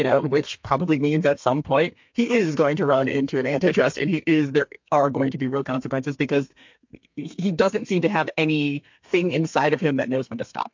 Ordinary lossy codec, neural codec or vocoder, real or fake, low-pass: MP3, 48 kbps; codec, 32 kHz, 1.9 kbps, SNAC; fake; 7.2 kHz